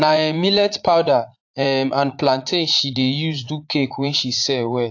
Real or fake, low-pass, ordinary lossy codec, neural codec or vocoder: fake; 7.2 kHz; AAC, 48 kbps; vocoder, 44.1 kHz, 80 mel bands, Vocos